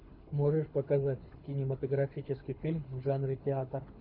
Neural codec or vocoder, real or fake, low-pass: codec, 24 kHz, 6 kbps, HILCodec; fake; 5.4 kHz